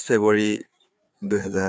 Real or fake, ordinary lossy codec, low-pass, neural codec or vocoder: fake; none; none; codec, 16 kHz, 4 kbps, FreqCodec, larger model